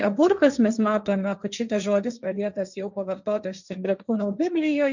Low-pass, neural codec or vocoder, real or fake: 7.2 kHz; codec, 16 kHz, 1.1 kbps, Voila-Tokenizer; fake